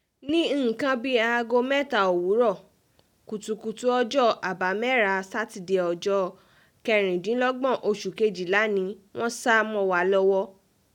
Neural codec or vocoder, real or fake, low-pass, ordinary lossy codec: none; real; 19.8 kHz; none